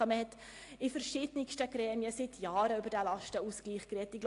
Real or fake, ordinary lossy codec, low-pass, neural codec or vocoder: real; AAC, 48 kbps; 10.8 kHz; none